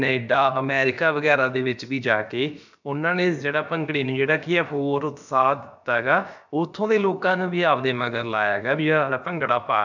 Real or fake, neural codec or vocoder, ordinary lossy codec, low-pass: fake; codec, 16 kHz, about 1 kbps, DyCAST, with the encoder's durations; none; 7.2 kHz